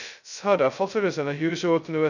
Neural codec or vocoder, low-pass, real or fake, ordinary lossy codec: codec, 16 kHz, 0.2 kbps, FocalCodec; 7.2 kHz; fake; none